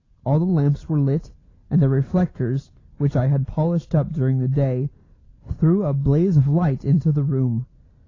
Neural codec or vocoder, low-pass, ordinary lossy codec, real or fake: none; 7.2 kHz; AAC, 32 kbps; real